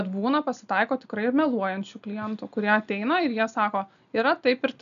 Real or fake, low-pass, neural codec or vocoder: real; 7.2 kHz; none